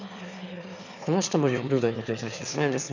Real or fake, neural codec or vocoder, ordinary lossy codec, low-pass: fake; autoencoder, 22.05 kHz, a latent of 192 numbers a frame, VITS, trained on one speaker; none; 7.2 kHz